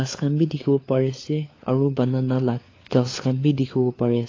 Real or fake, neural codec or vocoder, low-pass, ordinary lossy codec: fake; codec, 16 kHz, 4 kbps, FunCodec, trained on LibriTTS, 50 frames a second; 7.2 kHz; AAC, 32 kbps